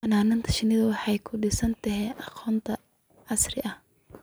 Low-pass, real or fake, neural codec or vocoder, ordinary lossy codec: none; real; none; none